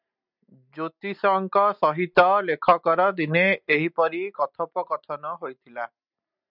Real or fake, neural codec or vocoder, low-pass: real; none; 5.4 kHz